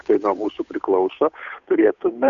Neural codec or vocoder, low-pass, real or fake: codec, 16 kHz, 8 kbps, FunCodec, trained on Chinese and English, 25 frames a second; 7.2 kHz; fake